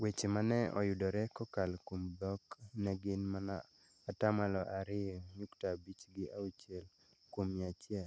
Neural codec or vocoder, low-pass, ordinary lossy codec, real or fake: none; none; none; real